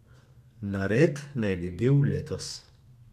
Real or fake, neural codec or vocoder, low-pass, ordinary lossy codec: fake; codec, 32 kHz, 1.9 kbps, SNAC; 14.4 kHz; none